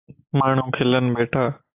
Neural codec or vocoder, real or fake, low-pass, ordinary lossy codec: none; real; 3.6 kHz; AAC, 24 kbps